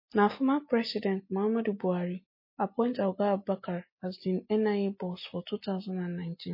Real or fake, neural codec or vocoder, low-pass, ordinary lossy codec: real; none; 5.4 kHz; MP3, 24 kbps